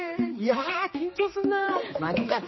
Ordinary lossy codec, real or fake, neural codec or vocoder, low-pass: MP3, 24 kbps; fake; codec, 16 kHz, 2 kbps, X-Codec, HuBERT features, trained on general audio; 7.2 kHz